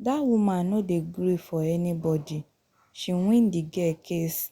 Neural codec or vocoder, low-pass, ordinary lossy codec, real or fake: none; 19.8 kHz; Opus, 64 kbps; real